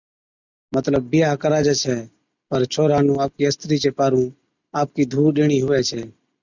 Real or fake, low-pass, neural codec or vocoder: real; 7.2 kHz; none